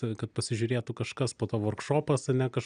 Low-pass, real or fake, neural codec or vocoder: 9.9 kHz; real; none